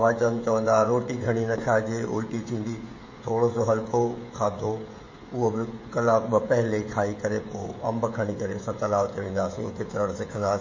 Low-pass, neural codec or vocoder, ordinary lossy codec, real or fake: 7.2 kHz; codec, 16 kHz, 16 kbps, FreqCodec, smaller model; MP3, 32 kbps; fake